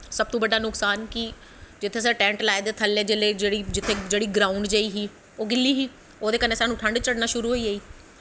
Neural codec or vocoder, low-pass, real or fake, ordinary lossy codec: none; none; real; none